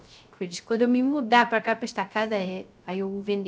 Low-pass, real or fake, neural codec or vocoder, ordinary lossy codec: none; fake; codec, 16 kHz, 0.3 kbps, FocalCodec; none